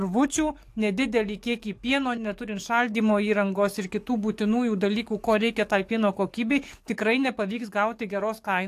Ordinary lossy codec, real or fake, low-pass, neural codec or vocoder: AAC, 64 kbps; fake; 14.4 kHz; codec, 44.1 kHz, 7.8 kbps, DAC